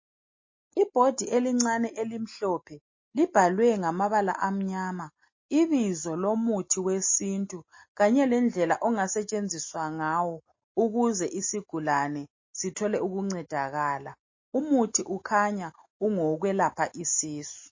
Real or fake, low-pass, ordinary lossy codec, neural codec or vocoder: real; 7.2 kHz; MP3, 32 kbps; none